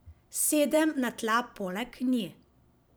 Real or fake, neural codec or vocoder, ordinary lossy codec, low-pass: fake; vocoder, 44.1 kHz, 128 mel bands every 512 samples, BigVGAN v2; none; none